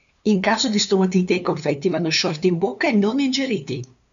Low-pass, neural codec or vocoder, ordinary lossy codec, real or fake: 7.2 kHz; codec, 16 kHz, 2 kbps, FunCodec, trained on LibriTTS, 25 frames a second; MP3, 96 kbps; fake